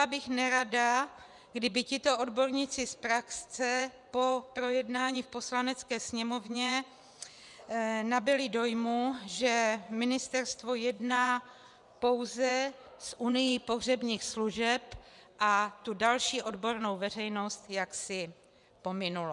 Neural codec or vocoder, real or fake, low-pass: vocoder, 24 kHz, 100 mel bands, Vocos; fake; 10.8 kHz